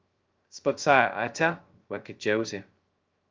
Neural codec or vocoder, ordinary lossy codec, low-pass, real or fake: codec, 16 kHz, 0.2 kbps, FocalCodec; Opus, 32 kbps; 7.2 kHz; fake